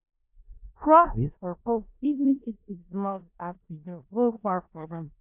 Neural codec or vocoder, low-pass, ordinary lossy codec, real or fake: codec, 16 kHz in and 24 kHz out, 0.4 kbps, LongCat-Audio-Codec, four codebook decoder; 3.6 kHz; none; fake